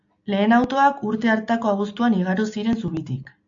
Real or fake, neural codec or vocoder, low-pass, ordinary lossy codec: real; none; 7.2 kHz; AAC, 64 kbps